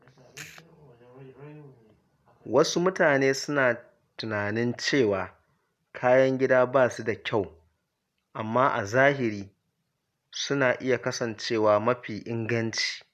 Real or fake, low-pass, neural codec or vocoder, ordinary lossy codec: real; 14.4 kHz; none; none